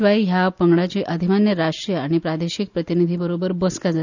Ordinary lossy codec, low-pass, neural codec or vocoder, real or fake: none; 7.2 kHz; none; real